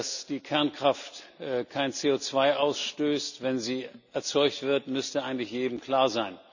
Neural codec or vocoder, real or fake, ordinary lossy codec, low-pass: none; real; none; 7.2 kHz